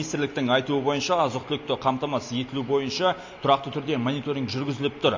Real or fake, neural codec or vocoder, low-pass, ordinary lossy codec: real; none; 7.2 kHz; AAC, 48 kbps